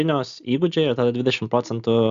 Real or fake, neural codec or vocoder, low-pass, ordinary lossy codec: real; none; 7.2 kHz; Opus, 64 kbps